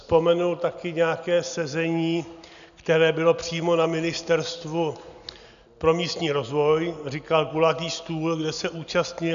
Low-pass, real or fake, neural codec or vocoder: 7.2 kHz; real; none